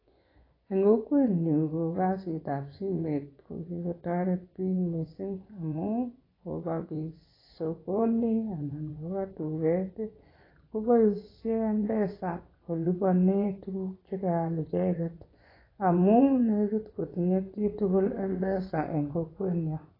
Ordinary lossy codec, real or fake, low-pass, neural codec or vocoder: AAC, 24 kbps; fake; 5.4 kHz; vocoder, 24 kHz, 100 mel bands, Vocos